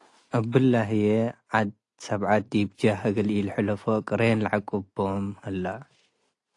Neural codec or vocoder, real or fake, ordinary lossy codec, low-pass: none; real; AAC, 48 kbps; 10.8 kHz